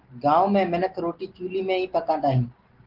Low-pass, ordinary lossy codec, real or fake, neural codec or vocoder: 5.4 kHz; Opus, 16 kbps; real; none